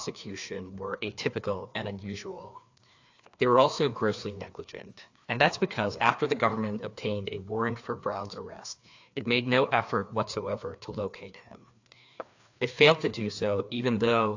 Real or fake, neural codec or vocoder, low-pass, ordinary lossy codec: fake; codec, 16 kHz, 2 kbps, FreqCodec, larger model; 7.2 kHz; AAC, 48 kbps